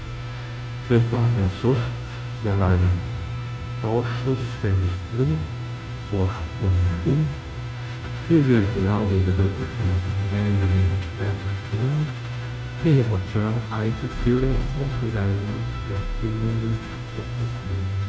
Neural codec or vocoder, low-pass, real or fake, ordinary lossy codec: codec, 16 kHz, 0.5 kbps, FunCodec, trained on Chinese and English, 25 frames a second; none; fake; none